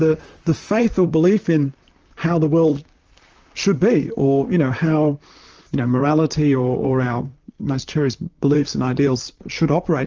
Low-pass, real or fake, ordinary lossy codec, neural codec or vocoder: 7.2 kHz; fake; Opus, 24 kbps; vocoder, 44.1 kHz, 128 mel bands, Pupu-Vocoder